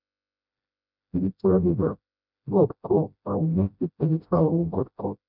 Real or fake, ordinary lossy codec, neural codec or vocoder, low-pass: fake; none; codec, 16 kHz, 0.5 kbps, FreqCodec, smaller model; 5.4 kHz